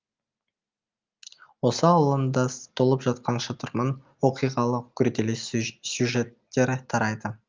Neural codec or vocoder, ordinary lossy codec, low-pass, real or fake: none; Opus, 24 kbps; 7.2 kHz; real